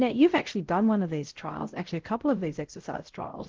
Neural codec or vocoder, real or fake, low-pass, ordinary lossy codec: codec, 16 kHz, 0.5 kbps, X-Codec, WavLM features, trained on Multilingual LibriSpeech; fake; 7.2 kHz; Opus, 16 kbps